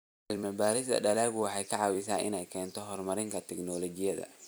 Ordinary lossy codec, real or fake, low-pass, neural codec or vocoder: none; fake; none; vocoder, 44.1 kHz, 128 mel bands every 256 samples, BigVGAN v2